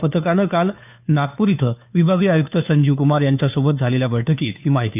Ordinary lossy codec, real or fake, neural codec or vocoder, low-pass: none; fake; codec, 16 kHz, 2 kbps, FunCodec, trained on Chinese and English, 25 frames a second; 3.6 kHz